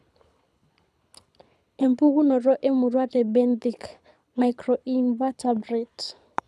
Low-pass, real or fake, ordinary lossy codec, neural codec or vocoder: none; fake; none; codec, 24 kHz, 6 kbps, HILCodec